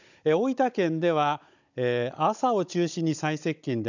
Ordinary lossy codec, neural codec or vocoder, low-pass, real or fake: none; codec, 16 kHz, 16 kbps, FunCodec, trained on Chinese and English, 50 frames a second; 7.2 kHz; fake